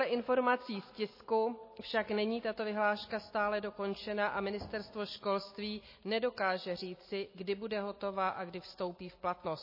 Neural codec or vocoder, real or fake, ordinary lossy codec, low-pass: none; real; MP3, 24 kbps; 5.4 kHz